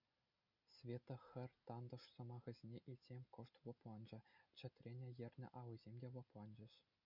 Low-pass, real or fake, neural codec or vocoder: 5.4 kHz; real; none